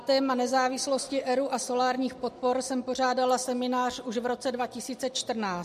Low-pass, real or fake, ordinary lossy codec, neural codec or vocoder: 14.4 kHz; real; MP3, 64 kbps; none